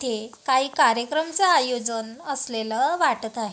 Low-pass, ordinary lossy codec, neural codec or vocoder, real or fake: none; none; none; real